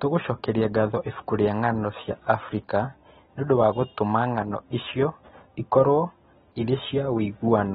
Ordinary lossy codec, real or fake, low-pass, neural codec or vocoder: AAC, 16 kbps; real; 19.8 kHz; none